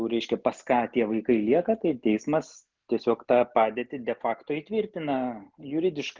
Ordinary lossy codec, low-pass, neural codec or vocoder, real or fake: Opus, 24 kbps; 7.2 kHz; none; real